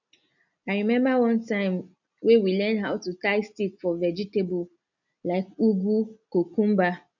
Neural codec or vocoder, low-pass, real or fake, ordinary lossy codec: none; 7.2 kHz; real; none